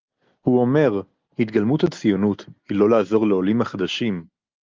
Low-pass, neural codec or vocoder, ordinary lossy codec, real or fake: 7.2 kHz; none; Opus, 24 kbps; real